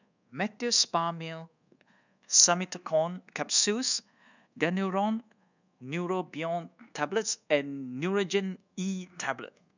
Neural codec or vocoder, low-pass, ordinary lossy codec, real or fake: codec, 24 kHz, 1.2 kbps, DualCodec; 7.2 kHz; none; fake